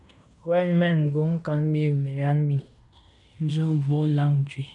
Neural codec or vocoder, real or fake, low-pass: codec, 16 kHz in and 24 kHz out, 0.9 kbps, LongCat-Audio-Codec, fine tuned four codebook decoder; fake; 10.8 kHz